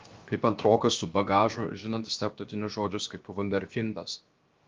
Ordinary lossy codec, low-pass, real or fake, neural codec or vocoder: Opus, 32 kbps; 7.2 kHz; fake; codec, 16 kHz, 0.7 kbps, FocalCodec